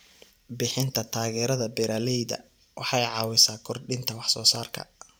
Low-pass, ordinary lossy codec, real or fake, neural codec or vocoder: none; none; fake; vocoder, 44.1 kHz, 128 mel bands every 512 samples, BigVGAN v2